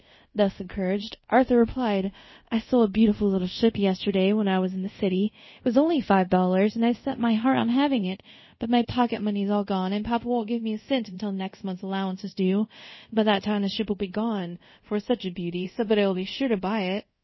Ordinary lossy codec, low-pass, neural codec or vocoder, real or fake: MP3, 24 kbps; 7.2 kHz; codec, 24 kHz, 0.5 kbps, DualCodec; fake